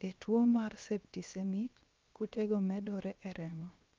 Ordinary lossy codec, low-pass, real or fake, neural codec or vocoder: Opus, 24 kbps; 7.2 kHz; fake; codec, 16 kHz, 0.7 kbps, FocalCodec